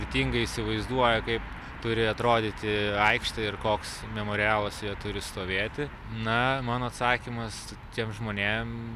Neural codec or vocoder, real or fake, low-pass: none; real; 14.4 kHz